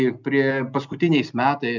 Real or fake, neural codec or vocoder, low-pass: real; none; 7.2 kHz